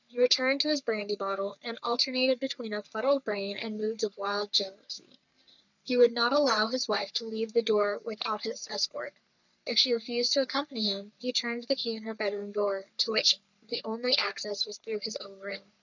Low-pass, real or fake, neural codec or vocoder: 7.2 kHz; fake; codec, 44.1 kHz, 3.4 kbps, Pupu-Codec